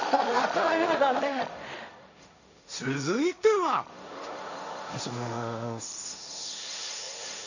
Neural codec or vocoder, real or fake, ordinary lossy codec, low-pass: codec, 16 kHz, 1.1 kbps, Voila-Tokenizer; fake; none; 7.2 kHz